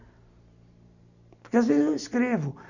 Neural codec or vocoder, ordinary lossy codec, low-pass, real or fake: none; none; 7.2 kHz; real